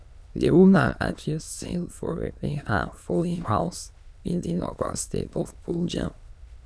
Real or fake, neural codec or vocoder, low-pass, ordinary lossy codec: fake; autoencoder, 22.05 kHz, a latent of 192 numbers a frame, VITS, trained on many speakers; none; none